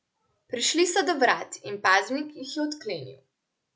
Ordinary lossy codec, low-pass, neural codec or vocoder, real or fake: none; none; none; real